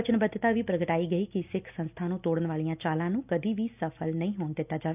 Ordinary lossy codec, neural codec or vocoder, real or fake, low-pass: none; none; real; 3.6 kHz